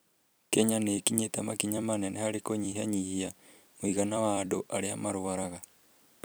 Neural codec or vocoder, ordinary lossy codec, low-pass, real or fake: vocoder, 44.1 kHz, 128 mel bands every 256 samples, BigVGAN v2; none; none; fake